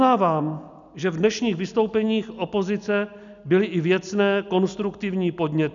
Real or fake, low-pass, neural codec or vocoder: real; 7.2 kHz; none